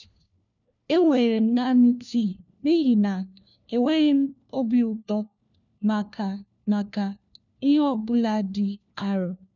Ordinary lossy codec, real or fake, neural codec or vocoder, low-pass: none; fake; codec, 16 kHz, 1 kbps, FunCodec, trained on LibriTTS, 50 frames a second; 7.2 kHz